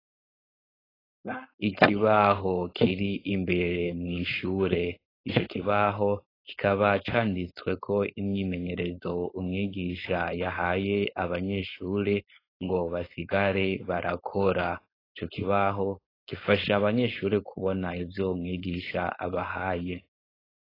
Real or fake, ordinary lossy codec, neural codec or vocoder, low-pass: fake; AAC, 24 kbps; codec, 16 kHz, 4.8 kbps, FACodec; 5.4 kHz